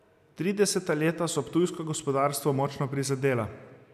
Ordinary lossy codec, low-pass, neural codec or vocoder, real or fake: none; 14.4 kHz; none; real